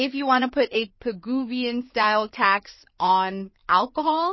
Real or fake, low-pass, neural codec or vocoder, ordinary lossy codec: fake; 7.2 kHz; codec, 16 kHz in and 24 kHz out, 2.2 kbps, FireRedTTS-2 codec; MP3, 24 kbps